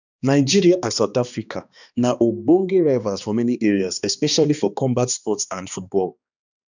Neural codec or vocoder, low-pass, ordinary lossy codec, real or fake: codec, 16 kHz, 2 kbps, X-Codec, HuBERT features, trained on balanced general audio; 7.2 kHz; none; fake